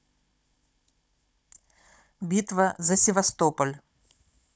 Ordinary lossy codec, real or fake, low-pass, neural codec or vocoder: none; fake; none; codec, 16 kHz, 16 kbps, FunCodec, trained on Chinese and English, 50 frames a second